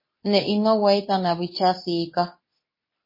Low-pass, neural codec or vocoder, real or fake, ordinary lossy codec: 5.4 kHz; codec, 24 kHz, 0.9 kbps, WavTokenizer, medium speech release version 2; fake; MP3, 24 kbps